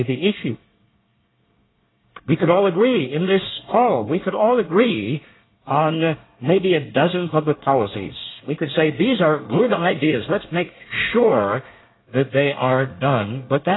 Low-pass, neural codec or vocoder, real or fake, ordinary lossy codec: 7.2 kHz; codec, 24 kHz, 1 kbps, SNAC; fake; AAC, 16 kbps